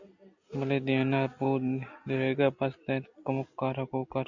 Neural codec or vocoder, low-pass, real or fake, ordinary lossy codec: none; 7.2 kHz; real; Opus, 64 kbps